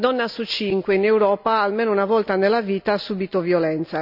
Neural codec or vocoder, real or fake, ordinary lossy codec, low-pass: none; real; none; 5.4 kHz